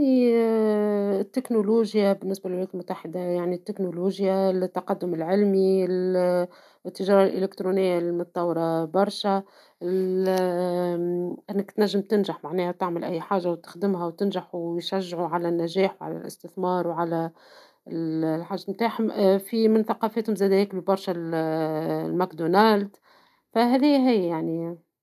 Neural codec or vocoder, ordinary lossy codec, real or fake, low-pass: none; none; real; 14.4 kHz